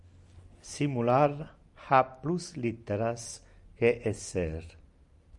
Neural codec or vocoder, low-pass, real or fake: none; 10.8 kHz; real